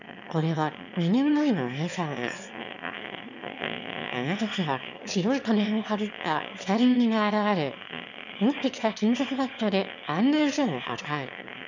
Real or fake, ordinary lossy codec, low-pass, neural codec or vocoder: fake; none; 7.2 kHz; autoencoder, 22.05 kHz, a latent of 192 numbers a frame, VITS, trained on one speaker